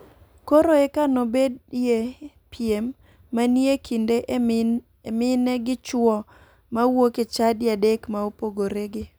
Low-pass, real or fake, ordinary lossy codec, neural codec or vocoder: none; real; none; none